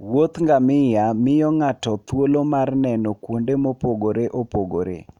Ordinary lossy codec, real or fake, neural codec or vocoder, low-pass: none; real; none; 19.8 kHz